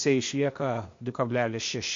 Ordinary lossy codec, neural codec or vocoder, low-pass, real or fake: MP3, 48 kbps; codec, 16 kHz, 0.8 kbps, ZipCodec; 7.2 kHz; fake